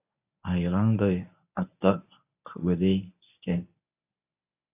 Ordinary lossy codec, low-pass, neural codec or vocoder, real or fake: AAC, 32 kbps; 3.6 kHz; codec, 24 kHz, 0.9 kbps, WavTokenizer, medium speech release version 1; fake